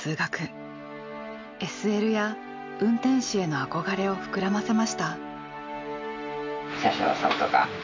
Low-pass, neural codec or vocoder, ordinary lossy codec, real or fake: 7.2 kHz; none; MP3, 64 kbps; real